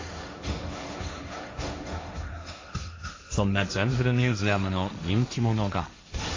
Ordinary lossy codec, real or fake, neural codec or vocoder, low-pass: AAC, 32 kbps; fake; codec, 16 kHz, 1.1 kbps, Voila-Tokenizer; 7.2 kHz